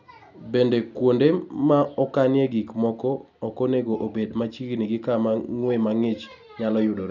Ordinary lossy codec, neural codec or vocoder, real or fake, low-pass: none; none; real; 7.2 kHz